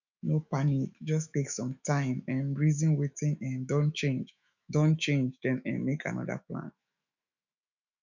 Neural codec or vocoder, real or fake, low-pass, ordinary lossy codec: autoencoder, 48 kHz, 128 numbers a frame, DAC-VAE, trained on Japanese speech; fake; 7.2 kHz; none